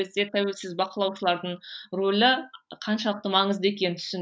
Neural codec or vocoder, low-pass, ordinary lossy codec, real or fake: none; none; none; real